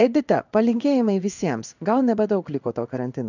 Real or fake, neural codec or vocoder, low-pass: fake; codec, 16 kHz in and 24 kHz out, 1 kbps, XY-Tokenizer; 7.2 kHz